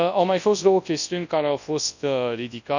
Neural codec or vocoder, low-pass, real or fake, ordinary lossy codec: codec, 24 kHz, 0.9 kbps, WavTokenizer, large speech release; 7.2 kHz; fake; none